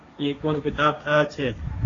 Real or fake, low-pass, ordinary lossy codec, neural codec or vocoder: fake; 7.2 kHz; AAC, 32 kbps; codec, 16 kHz, 1.1 kbps, Voila-Tokenizer